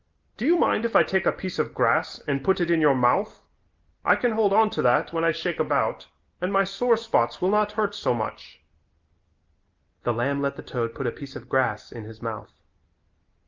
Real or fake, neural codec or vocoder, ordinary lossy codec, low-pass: real; none; Opus, 32 kbps; 7.2 kHz